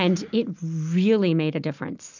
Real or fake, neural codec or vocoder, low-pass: real; none; 7.2 kHz